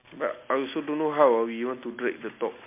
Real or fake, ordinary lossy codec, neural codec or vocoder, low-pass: real; MP3, 24 kbps; none; 3.6 kHz